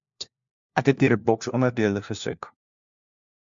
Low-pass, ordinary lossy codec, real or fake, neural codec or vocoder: 7.2 kHz; MP3, 64 kbps; fake; codec, 16 kHz, 1 kbps, FunCodec, trained on LibriTTS, 50 frames a second